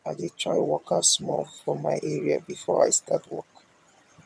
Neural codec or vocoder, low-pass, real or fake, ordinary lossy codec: vocoder, 22.05 kHz, 80 mel bands, HiFi-GAN; none; fake; none